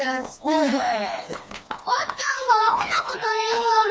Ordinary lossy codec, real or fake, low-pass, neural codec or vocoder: none; fake; none; codec, 16 kHz, 2 kbps, FreqCodec, smaller model